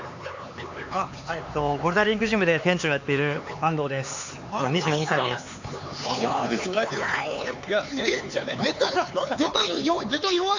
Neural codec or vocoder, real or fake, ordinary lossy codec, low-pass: codec, 16 kHz, 4 kbps, X-Codec, HuBERT features, trained on LibriSpeech; fake; AAC, 48 kbps; 7.2 kHz